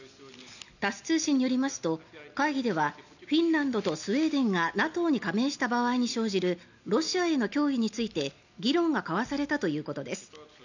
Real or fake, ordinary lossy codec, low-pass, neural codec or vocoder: real; none; 7.2 kHz; none